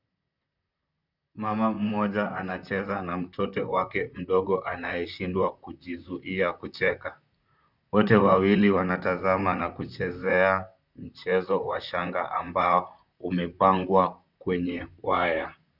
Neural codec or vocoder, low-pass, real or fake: vocoder, 44.1 kHz, 128 mel bands, Pupu-Vocoder; 5.4 kHz; fake